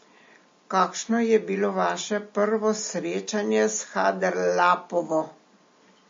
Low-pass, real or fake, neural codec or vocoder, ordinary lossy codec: 7.2 kHz; real; none; MP3, 32 kbps